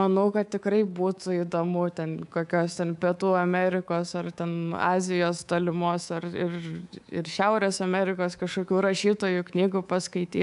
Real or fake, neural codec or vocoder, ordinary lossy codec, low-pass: fake; codec, 24 kHz, 3.1 kbps, DualCodec; AAC, 96 kbps; 10.8 kHz